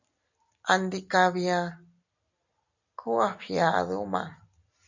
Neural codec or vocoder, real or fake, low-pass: none; real; 7.2 kHz